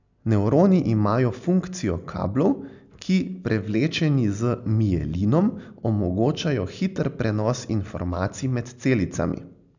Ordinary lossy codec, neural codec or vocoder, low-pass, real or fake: none; none; 7.2 kHz; real